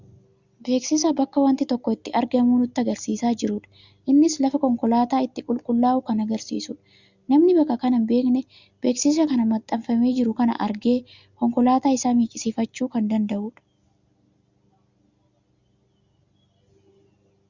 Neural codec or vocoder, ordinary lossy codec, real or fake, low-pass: none; Opus, 64 kbps; real; 7.2 kHz